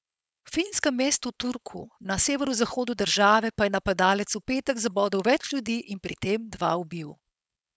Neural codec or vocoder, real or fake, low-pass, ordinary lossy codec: codec, 16 kHz, 4.8 kbps, FACodec; fake; none; none